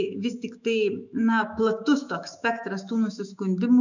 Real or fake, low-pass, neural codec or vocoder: fake; 7.2 kHz; autoencoder, 48 kHz, 128 numbers a frame, DAC-VAE, trained on Japanese speech